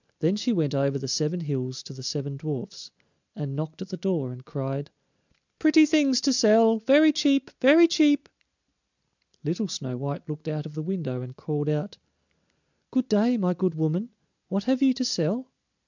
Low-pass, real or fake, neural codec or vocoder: 7.2 kHz; real; none